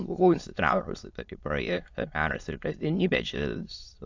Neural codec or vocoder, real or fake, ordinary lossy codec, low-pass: autoencoder, 22.05 kHz, a latent of 192 numbers a frame, VITS, trained on many speakers; fake; AAC, 48 kbps; 7.2 kHz